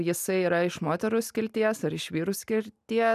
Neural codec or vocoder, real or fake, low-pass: none; real; 14.4 kHz